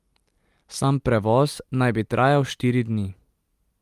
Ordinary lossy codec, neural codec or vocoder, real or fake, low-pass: Opus, 32 kbps; none; real; 14.4 kHz